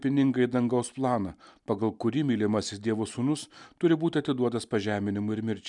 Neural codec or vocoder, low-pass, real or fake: none; 10.8 kHz; real